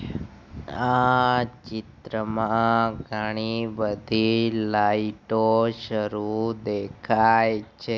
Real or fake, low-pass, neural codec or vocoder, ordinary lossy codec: real; none; none; none